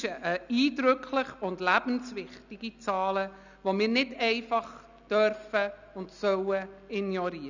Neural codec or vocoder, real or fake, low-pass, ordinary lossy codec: none; real; 7.2 kHz; none